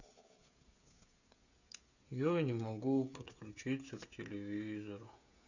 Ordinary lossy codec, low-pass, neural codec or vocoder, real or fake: none; 7.2 kHz; codec, 16 kHz, 16 kbps, FreqCodec, smaller model; fake